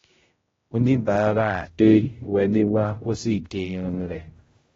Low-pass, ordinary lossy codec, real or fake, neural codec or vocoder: 7.2 kHz; AAC, 24 kbps; fake; codec, 16 kHz, 0.5 kbps, X-Codec, HuBERT features, trained on general audio